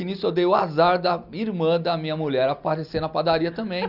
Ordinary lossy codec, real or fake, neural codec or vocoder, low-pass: Opus, 64 kbps; real; none; 5.4 kHz